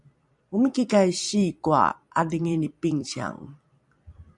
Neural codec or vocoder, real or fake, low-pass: vocoder, 44.1 kHz, 128 mel bands every 256 samples, BigVGAN v2; fake; 10.8 kHz